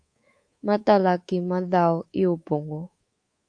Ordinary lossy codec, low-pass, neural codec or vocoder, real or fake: AAC, 48 kbps; 9.9 kHz; codec, 24 kHz, 3.1 kbps, DualCodec; fake